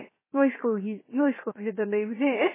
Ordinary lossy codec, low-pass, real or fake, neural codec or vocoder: MP3, 16 kbps; 3.6 kHz; fake; codec, 16 kHz, 0.7 kbps, FocalCodec